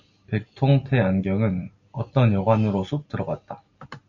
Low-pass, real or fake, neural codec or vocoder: 7.2 kHz; real; none